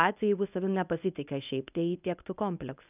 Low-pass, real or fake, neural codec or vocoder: 3.6 kHz; fake; codec, 24 kHz, 0.9 kbps, WavTokenizer, medium speech release version 1